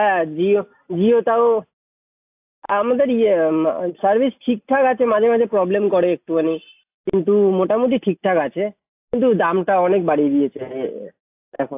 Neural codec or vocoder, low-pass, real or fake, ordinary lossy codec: none; 3.6 kHz; real; AAC, 32 kbps